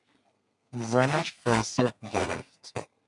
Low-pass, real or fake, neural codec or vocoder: 10.8 kHz; fake; codec, 32 kHz, 1.9 kbps, SNAC